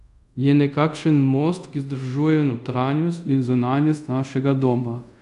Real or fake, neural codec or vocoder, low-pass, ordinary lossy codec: fake; codec, 24 kHz, 0.5 kbps, DualCodec; 10.8 kHz; none